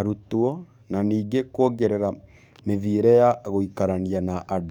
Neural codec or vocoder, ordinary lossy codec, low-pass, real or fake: codec, 44.1 kHz, 7.8 kbps, DAC; none; 19.8 kHz; fake